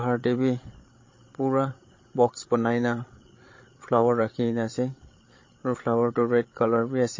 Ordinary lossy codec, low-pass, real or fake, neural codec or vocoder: MP3, 32 kbps; 7.2 kHz; fake; codec, 16 kHz, 16 kbps, FreqCodec, larger model